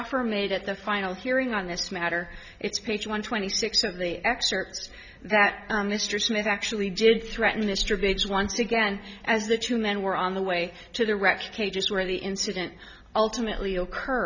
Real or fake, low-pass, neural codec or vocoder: real; 7.2 kHz; none